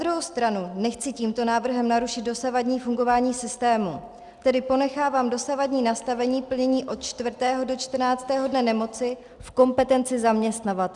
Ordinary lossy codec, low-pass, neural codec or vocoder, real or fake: Opus, 64 kbps; 10.8 kHz; none; real